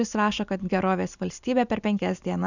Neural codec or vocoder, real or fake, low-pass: none; real; 7.2 kHz